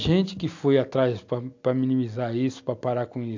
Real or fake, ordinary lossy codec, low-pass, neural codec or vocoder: real; AAC, 48 kbps; 7.2 kHz; none